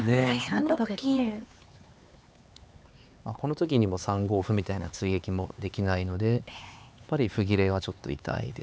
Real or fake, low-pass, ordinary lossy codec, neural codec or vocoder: fake; none; none; codec, 16 kHz, 4 kbps, X-Codec, HuBERT features, trained on LibriSpeech